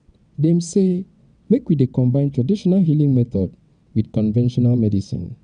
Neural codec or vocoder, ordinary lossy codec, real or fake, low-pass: vocoder, 22.05 kHz, 80 mel bands, WaveNeXt; none; fake; 9.9 kHz